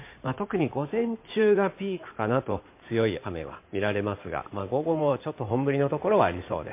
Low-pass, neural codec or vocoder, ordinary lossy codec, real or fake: 3.6 kHz; codec, 16 kHz in and 24 kHz out, 2.2 kbps, FireRedTTS-2 codec; MP3, 24 kbps; fake